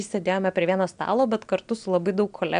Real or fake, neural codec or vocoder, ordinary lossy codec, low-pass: real; none; MP3, 96 kbps; 9.9 kHz